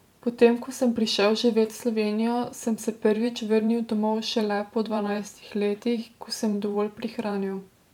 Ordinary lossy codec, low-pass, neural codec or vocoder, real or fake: MP3, 96 kbps; 19.8 kHz; vocoder, 44.1 kHz, 128 mel bands every 512 samples, BigVGAN v2; fake